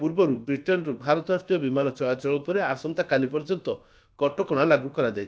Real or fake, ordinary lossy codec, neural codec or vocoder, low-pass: fake; none; codec, 16 kHz, about 1 kbps, DyCAST, with the encoder's durations; none